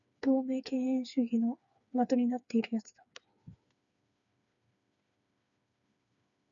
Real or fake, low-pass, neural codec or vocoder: fake; 7.2 kHz; codec, 16 kHz, 4 kbps, FreqCodec, smaller model